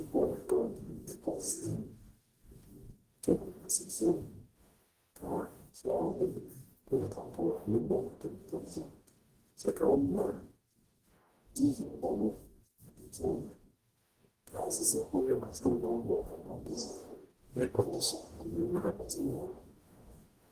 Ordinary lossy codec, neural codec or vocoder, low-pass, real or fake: Opus, 24 kbps; codec, 44.1 kHz, 0.9 kbps, DAC; 14.4 kHz; fake